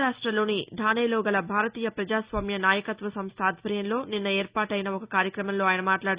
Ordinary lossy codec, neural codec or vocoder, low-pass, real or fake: Opus, 64 kbps; none; 3.6 kHz; real